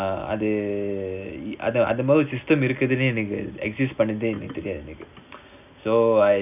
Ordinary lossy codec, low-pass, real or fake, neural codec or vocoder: none; 3.6 kHz; real; none